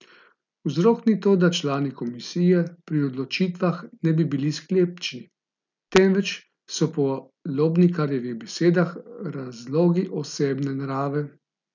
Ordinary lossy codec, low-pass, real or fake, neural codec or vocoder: none; 7.2 kHz; real; none